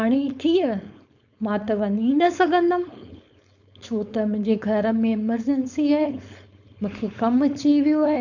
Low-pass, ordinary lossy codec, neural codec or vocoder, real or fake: 7.2 kHz; none; codec, 16 kHz, 4.8 kbps, FACodec; fake